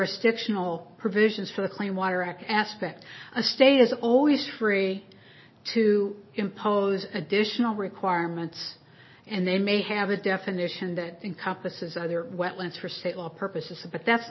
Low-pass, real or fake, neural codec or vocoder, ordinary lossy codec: 7.2 kHz; real; none; MP3, 24 kbps